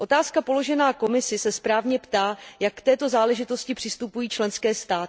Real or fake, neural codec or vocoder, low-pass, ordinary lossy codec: real; none; none; none